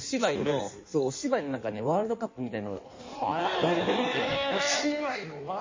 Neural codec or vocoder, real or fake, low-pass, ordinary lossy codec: codec, 16 kHz in and 24 kHz out, 1.1 kbps, FireRedTTS-2 codec; fake; 7.2 kHz; MP3, 32 kbps